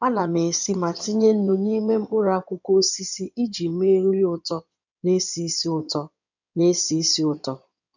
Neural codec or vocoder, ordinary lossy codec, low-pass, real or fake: codec, 16 kHz in and 24 kHz out, 2.2 kbps, FireRedTTS-2 codec; none; 7.2 kHz; fake